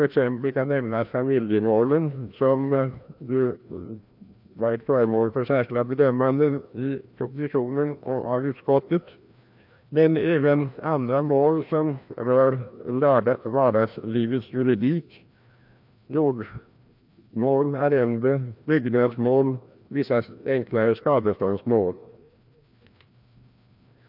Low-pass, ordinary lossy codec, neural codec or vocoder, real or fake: 5.4 kHz; MP3, 48 kbps; codec, 16 kHz, 1 kbps, FreqCodec, larger model; fake